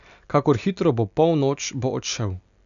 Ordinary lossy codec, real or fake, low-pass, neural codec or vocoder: none; real; 7.2 kHz; none